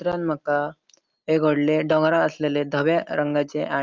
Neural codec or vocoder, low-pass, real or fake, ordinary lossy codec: none; 7.2 kHz; real; Opus, 32 kbps